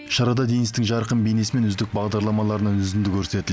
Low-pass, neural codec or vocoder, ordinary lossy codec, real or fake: none; none; none; real